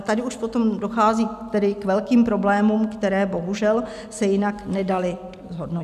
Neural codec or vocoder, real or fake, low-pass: none; real; 14.4 kHz